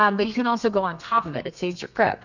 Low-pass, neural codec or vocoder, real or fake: 7.2 kHz; codec, 32 kHz, 1.9 kbps, SNAC; fake